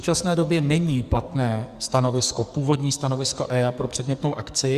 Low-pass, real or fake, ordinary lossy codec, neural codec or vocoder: 14.4 kHz; fake; Opus, 64 kbps; codec, 44.1 kHz, 2.6 kbps, SNAC